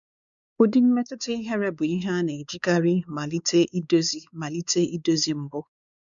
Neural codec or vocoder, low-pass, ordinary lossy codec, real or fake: codec, 16 kHz, 4 kbps, X-Codec, WavLM features, trained on Multilingual LibriSpeech; 7.2 kHz; none; fake